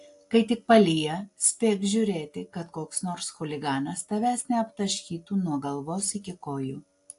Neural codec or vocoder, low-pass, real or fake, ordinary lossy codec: none; 10.8 kHz; real; AAC, 48 kbps